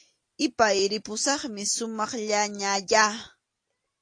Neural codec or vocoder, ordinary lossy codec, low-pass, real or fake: none; AAC, 48 kbps; 9.9 kHz; real